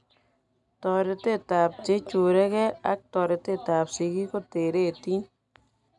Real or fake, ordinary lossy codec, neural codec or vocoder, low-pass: real; none; none; 10.8 kHz